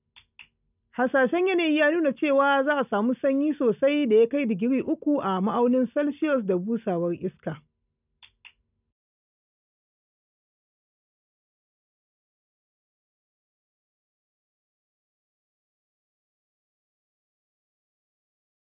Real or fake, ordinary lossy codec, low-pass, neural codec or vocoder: real; none; 3.6 kHz; none